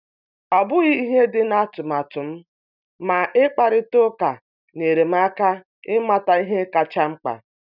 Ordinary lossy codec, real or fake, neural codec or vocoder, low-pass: none; real; none; 5.4 kHz